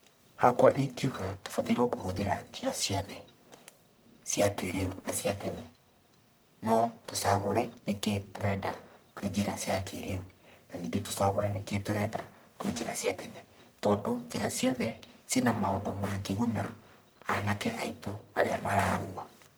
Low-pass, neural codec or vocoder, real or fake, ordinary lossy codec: none; codec, 44.1 kHz, 1.7 kbps, Pupu-Codec; fake; none